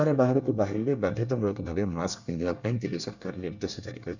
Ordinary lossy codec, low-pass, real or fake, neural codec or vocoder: none; 7.2 kHz; fake; codec, 24 kHz, 1 kbps, SNAC